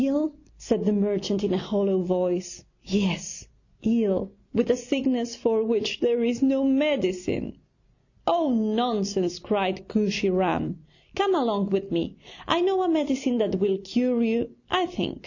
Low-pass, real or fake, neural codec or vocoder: 7.2 kHz; real; none